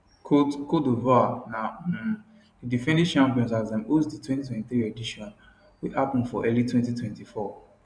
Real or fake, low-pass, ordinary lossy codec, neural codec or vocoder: fake; 9.9 kHz; none; vocoder, 44.1 kHz, 128 mel bands every 512 samples, BigVGAN v2